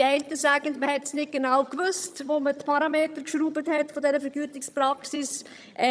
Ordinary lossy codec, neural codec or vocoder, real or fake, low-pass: none; vocoder, 22.05 kHz, 80 mel bands, HiFi-GAN; fake; none